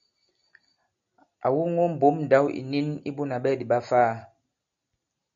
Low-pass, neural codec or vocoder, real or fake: 7.2 kHz; none; real